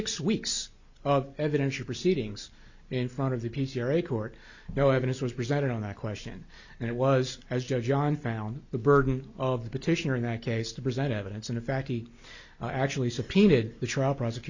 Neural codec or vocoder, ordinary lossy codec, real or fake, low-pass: none; Opus, 64 kbps; real; 7.2 kHz